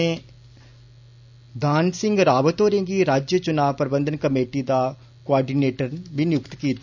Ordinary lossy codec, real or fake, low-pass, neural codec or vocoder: none; real; 7.2 kHz; none